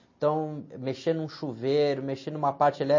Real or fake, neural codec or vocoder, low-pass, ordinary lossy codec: real; none; 7.2 kHz; MP3, 32 kbps